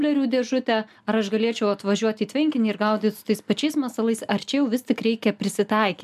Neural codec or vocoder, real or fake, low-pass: none; real; 14.4 kHz